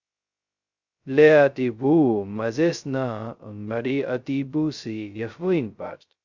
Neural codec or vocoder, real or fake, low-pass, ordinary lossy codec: codec, 16 kHz, 0.2 kbps, FocalCodec; fake; 7.2 kHz; Opus, 64 kbps